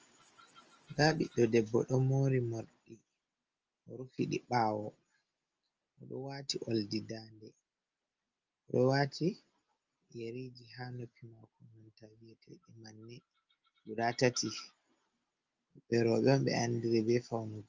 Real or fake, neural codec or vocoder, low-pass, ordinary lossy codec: real; none; 7.2 kHz; Opus, 24 kbps